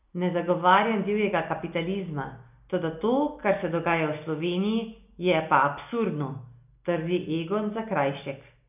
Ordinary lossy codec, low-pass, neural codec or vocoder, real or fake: none; 3.6 kHz; none; real